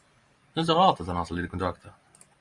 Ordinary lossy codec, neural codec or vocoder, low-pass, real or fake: Opus, 64 kbps; vocoder, 44.1 kHz, 128 mel bands every 512 samples, BigVGAN v2; 10.8 kHz; fake